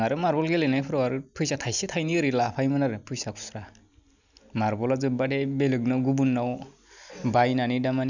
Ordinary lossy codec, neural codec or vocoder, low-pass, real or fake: none; none; 7.2 kHz; real